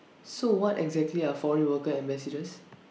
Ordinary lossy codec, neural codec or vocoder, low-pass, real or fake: none; none; none; real